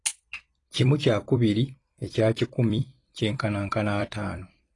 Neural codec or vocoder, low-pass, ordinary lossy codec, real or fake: vocoder, 44.1 kHz, 128 mel bands every 256 samples, BigVGAN v2; 10.8 kHz; AAC, 32 kbps; fake